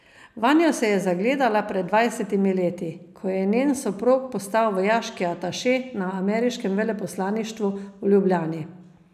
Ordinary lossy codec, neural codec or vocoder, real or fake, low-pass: none; none; real; 14.4 kHz